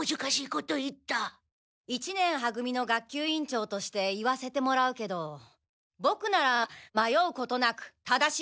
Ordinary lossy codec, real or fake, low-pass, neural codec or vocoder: none; real; none; none